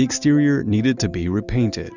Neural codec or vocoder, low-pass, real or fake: none; 7.2 kHz; real